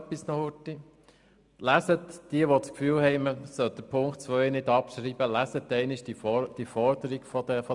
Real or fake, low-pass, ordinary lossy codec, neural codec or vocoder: real; 10.8 kHz; none; none